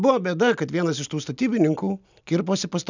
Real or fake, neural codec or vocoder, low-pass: real; none; 7.2 kHz